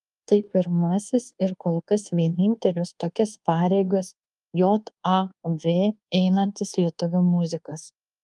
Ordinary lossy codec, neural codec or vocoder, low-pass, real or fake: Opus, 32 kbps; codec, 24 kHz, 1.2 kbps, DualCodec; 10.8 kHz; fake